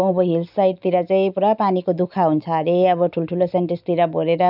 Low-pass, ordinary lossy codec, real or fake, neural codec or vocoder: 5.4 kHz; none; real; none